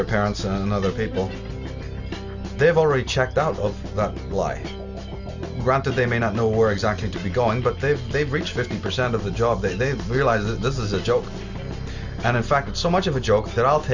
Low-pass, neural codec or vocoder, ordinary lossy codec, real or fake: 7.2 kHz; none; Opus, 64 kbps; real